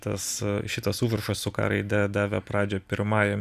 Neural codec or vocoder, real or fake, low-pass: vocoder, 44.1 kHz, 128 mel bands every 256 samples, BigVGAN v2; fake; 14.4 kHz